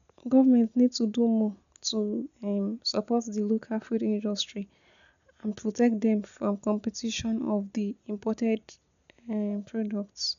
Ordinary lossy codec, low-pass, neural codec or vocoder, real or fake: none; 7.2 kHz; none; real